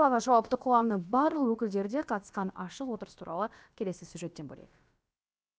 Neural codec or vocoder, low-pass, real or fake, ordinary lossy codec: codec, 16 kHz, about 1 kbps, DyCAST, with the encoder's durations; none; fake; none